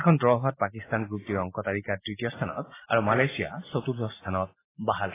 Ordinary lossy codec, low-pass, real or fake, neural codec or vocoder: AAC, 16 kbps; 3.6 kHz; real; none